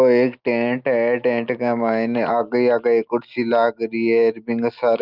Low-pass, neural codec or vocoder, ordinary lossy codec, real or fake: 5.4 kHz; none; Opus, 32 kbps; real